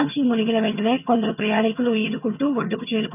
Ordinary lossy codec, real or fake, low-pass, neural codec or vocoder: none; fake; 3.6 kHz; vocoder, 22.05 kHz, 80 mel bands, HiFi-GAN